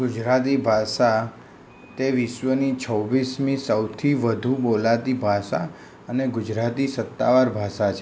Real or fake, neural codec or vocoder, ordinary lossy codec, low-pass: real; none; none; none